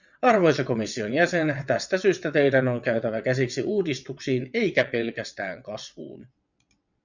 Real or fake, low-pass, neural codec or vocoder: fake; 7.2 kHz; vocoder, 22.05 kHz, 80 mel bands, WaveNeXt